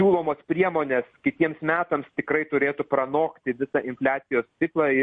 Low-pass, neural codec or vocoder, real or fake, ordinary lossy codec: 9.9 kHz; none; real; MP3, 48 kbps